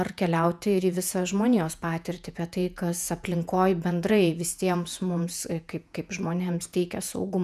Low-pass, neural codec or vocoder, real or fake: 14.4 kHz; vocoder, 48 kHz, 128 mel bands, Vocos; fake